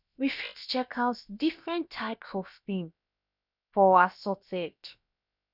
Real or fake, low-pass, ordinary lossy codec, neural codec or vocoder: fake; 5.4 kHz; none; codec, 16 kHz, about 1 kbps, DyCAST, with the encoder's durations